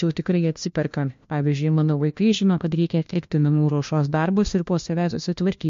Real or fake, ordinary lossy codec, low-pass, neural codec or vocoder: fake; MP3, 48 kbps; 7.2 kHz; codec, 16 kHz, 1 kbps, FunCodec, trained on LibriTTS, 50 frames a second